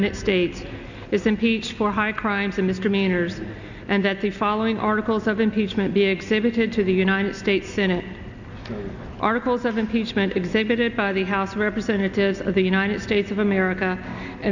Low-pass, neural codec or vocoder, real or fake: 7.2 kHz; none; real